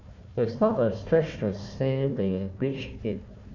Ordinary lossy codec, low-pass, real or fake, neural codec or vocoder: none; 7.2 kHz; fake; codec, 16 kHz, 1 kbps, FunCodec, trained on Chinese and English, 50 frames a second